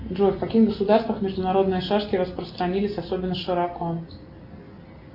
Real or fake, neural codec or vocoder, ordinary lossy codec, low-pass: real; none; Opus, 64 kbps; 5.4 kHz